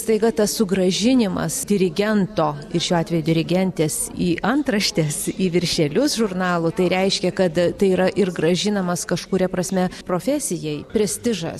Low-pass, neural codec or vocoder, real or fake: 14.4 kHz; none; real